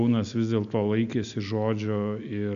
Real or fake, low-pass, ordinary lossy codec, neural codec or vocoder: real; 7.2 kHz; MP3, 96 kbps; none